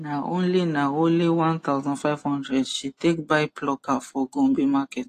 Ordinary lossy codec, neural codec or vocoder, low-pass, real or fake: AAC, 48 kbps; none; 14.4 kHz; real